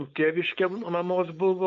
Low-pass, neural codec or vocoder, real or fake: 7.2 kHz; codec, 16 kHz, 4.8 kbps, FACodec; fake